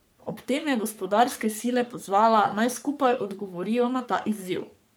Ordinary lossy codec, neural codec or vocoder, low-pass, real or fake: none; codec, 44.1 kHz, 3.4 kbps, Pupu-Codec; none; fake